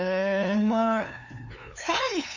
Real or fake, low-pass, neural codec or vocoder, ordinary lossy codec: fake; 7.2 kHz; codec, 16 kHz, 2 kbps, FunCodec, trained on LibriTTS, 25 frames a second; none